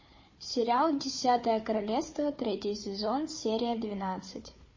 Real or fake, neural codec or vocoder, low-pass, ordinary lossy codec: fake; codec, 16 kHz, 4 kbps, FunCodec, trained on Chinese and English, 50 frames a second; 7.2 kHz; MP3, 32 kbps